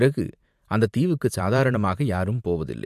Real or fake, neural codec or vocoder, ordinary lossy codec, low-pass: fake; vocoder, 44.1 kHz, 128 mel bands every 512 samples, BigVGAN v2; MP3, 64 kbps; 14.4 kHz